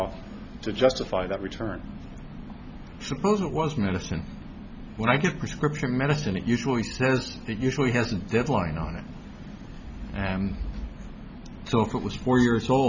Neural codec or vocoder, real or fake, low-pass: none; real; 7.2 kHz